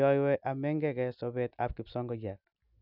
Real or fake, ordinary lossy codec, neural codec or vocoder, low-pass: real; none; none; 5.4 kHz